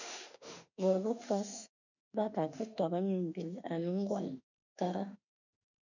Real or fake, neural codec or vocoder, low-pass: fake; autoencoder, 48 kHz, 32 numbers a frame, DAC-VAE, trained on Japanese speech; 7.2 kHz